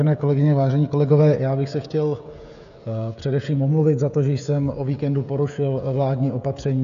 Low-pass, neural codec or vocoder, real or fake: 7.2 kHz; codec, 16 kHz, 16 kbps, FreqCodec, smaller model; fake